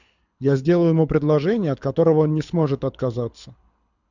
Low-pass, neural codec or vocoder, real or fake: 7.2 kHz; codec, 24 kHz, 6 kbps, HILCodec; fake